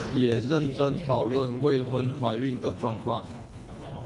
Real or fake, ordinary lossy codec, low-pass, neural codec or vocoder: fake; AAC, 64 kbps; 10.8 kHz; codec, 24 kHz, 1.5 kbps, HILCodec